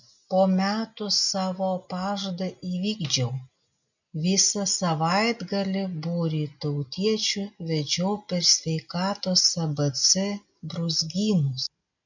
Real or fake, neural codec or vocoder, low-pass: real; none; 7.2 kHz